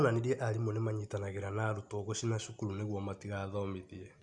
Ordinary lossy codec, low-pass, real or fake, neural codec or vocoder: Opus, 64 kbps; 10.8 kHz; real; none